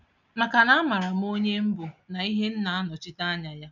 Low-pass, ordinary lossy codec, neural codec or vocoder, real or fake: 7.2 kHz; none; none; real